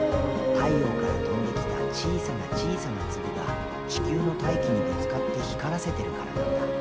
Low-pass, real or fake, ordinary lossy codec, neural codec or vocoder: none; real; none; none